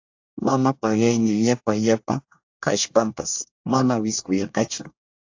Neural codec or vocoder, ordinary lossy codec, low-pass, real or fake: codec, 24 kHz, 1 kbps, SNAC; AAC, 48 kbps; 7.2 kHz; fake